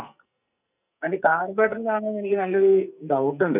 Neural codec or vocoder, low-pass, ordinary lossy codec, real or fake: codec, 44.1 kHz, 2.6 kbps, SNAC; 3.6 kHz; none; fake